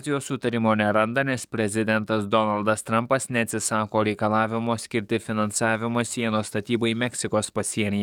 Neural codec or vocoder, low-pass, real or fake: codec, 44.1 kHz, 7.8 kbps, DAC; 19.8 kHz; fake